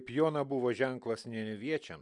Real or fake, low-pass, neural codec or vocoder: real; 10.8 kHz; none